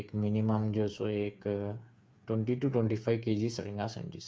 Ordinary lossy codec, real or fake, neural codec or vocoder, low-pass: none; fake; codec, 16 kHz, 8 kbps, FreqCodec, smaller model; none